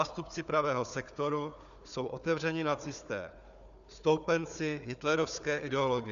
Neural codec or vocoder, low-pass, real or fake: codec, 16 kHz, 4 kbps, FunCodec, trained on Chinese and English, 50 frames a second; 7.2 kHz; fake